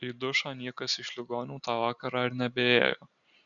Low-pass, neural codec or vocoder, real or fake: 7.2 kHz; none; real